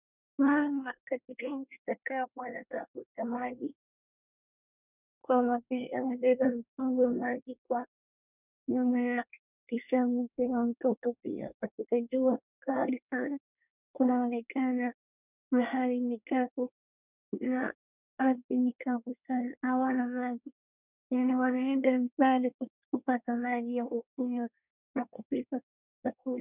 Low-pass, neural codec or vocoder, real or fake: 3.6 kHz; codec, 24 kHz, 1 kbps, SNAC; fake